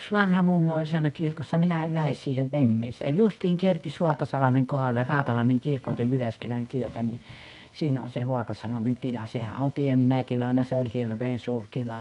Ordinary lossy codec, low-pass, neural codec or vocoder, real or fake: none; 10.8 kHz; codec, 24 kHz, 0.9 kbps, WavTokenizer, medium music audio release; fake